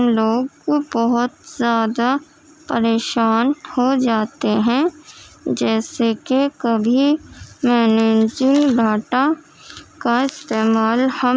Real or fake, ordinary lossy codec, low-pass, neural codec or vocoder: real; none; none; none